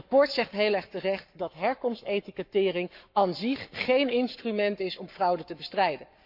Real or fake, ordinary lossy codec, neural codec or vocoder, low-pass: fake; MP3, 48 kbps; codec, 44.1 kHz, 7.8 kbps, Pupu-Codec; 5.4 kHz